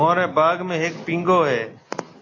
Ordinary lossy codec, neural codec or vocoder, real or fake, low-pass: AAC, 32 kbps; none; real; 7.2 kHz